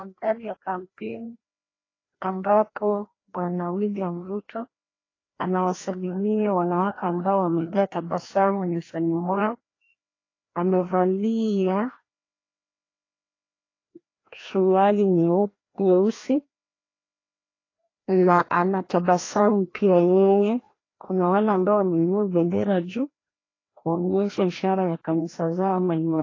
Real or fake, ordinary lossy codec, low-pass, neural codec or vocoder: fake; AAC, 32 kbps; 7.2 kHz; codec, 16 kHz, 1 kbps, FreqCodec, larger model